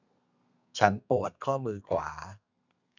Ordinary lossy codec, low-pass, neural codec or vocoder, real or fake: none; 7.2 kHz; codec, 32 kHz, 1.9 kbps, SNAC; fake